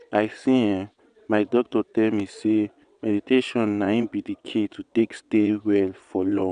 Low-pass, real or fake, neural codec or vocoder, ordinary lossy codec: 9.9 kHz; fake; vocoder, 22.05 kHz, 80 mel bands, WaveNeXt; none